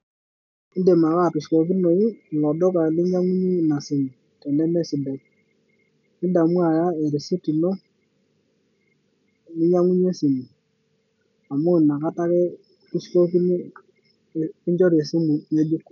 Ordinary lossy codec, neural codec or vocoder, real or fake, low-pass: none; none; real; 7.2 kHz